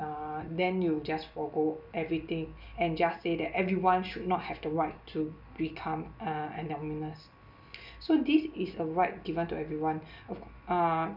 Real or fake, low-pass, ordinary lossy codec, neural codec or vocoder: real; 5.4 kHz; none; none